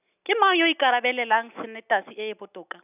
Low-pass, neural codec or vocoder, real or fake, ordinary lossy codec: 3.6 kHz; none; real; none